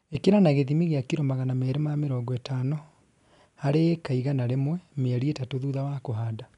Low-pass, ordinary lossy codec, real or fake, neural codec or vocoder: 10.8 kHz; none; real; none